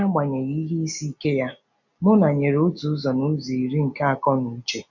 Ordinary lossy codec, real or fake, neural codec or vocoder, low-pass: none; real; none; 7.2 kHz